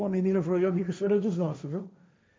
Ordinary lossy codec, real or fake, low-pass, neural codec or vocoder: none; fake; none; codec, 16 kHz, 1.1 kbps, Voila-Tokenizer